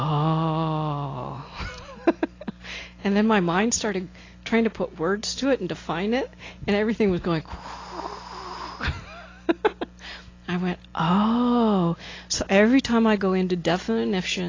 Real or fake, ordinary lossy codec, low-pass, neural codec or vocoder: real; AAC, 32 kbps; 7.2 kHz; none